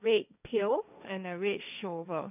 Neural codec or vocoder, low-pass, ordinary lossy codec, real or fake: codec, 16 kHz, 1.1 kbps, Voila-Tokenizer; 3.6 kHz; none; fake